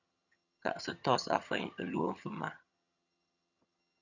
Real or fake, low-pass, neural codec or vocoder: fake; 7.2 kHz; vocoder, 22.05 kHz, 80 mel bands, HiFi-GAN